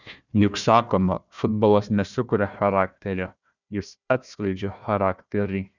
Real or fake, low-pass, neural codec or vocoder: fake; 7.2 kHz; codec, 16 kHz, 1 kbps, FunCodec, trained on Chinese and English, 50 frames a second